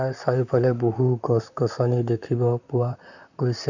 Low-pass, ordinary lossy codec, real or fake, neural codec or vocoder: 7.2 kHz; none; fake; vocoder, 44.1 kHz, 128 mel bands, Pupu-Vocoder